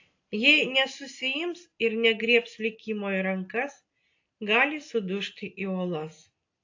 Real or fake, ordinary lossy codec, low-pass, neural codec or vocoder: real; AAC, 48 kbps; 7.2 kHz; none